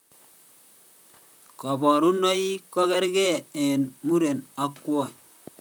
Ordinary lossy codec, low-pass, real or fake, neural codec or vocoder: none; none; fake; vocoder, 44.1 kHz, 128 mel bands, Pupu-Vocoder